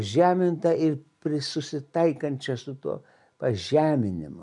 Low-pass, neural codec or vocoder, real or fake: 10.8 kHz; none; real